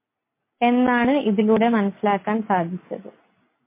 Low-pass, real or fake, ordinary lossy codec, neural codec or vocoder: 3.6 kHz; real; MP3, 24 kbps; none